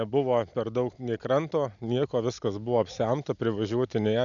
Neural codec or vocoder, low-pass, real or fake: none; 7.2 kHz; real